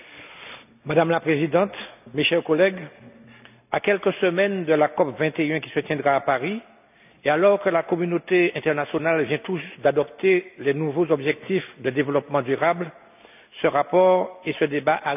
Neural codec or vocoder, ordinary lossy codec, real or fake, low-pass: none; none; real; 3.6 kHz